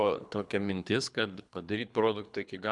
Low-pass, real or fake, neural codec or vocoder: 10.8 kHz; fake; codec, 24 kHz, 3 kbps, HILCodec